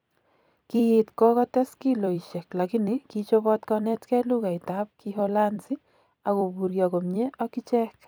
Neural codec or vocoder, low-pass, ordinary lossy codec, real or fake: vocoder, 44.1 kHz, 128 mel bands every 256 samples, BigVGAN v2; none; none; fake